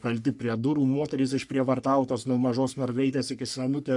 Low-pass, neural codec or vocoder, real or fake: 10.8 kHz; codec, 44.1 kHz, 3.4 kbps, Pupu-Codec; fake